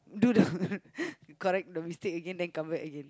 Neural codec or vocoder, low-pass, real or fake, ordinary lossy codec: none; none; real; none